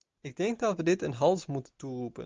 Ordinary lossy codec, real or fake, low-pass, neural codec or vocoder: Opus, 24 kbps; real; 7.2 kHz; none